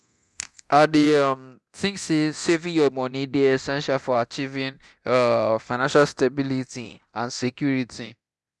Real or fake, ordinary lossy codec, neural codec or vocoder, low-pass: fake; none; codec, 24 kHz, 0.9 kbps, DualCodec; none